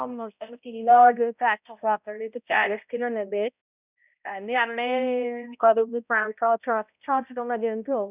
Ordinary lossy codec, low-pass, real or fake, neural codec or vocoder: none; 3.6 kHz; fake; codec, 16 kHz, 0.5 kbps, X-Codec, HuBERT features, trained on balanced general audio